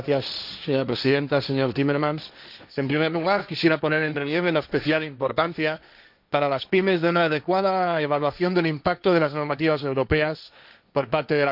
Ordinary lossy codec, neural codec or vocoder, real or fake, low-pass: none; codec, 16 kHz, 1.1 kbps, Voila-Tokenizer; fake; 5.4 kHz